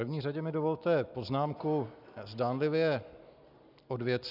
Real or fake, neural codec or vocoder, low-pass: real; none; 5.4 kHz